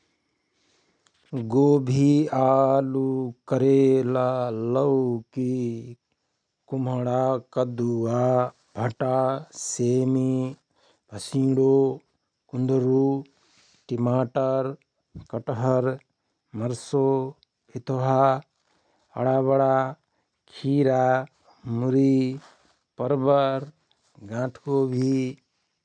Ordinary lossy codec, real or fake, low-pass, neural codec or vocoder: none; real; 9.9 kHz; none